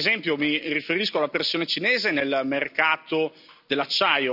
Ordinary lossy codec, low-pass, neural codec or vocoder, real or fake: none; 5.4 kHz; none; real